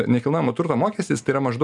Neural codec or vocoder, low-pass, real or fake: none; 10.8 kHz; real